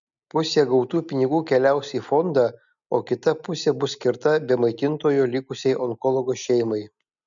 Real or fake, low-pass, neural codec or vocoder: real; 7.2 kHz; none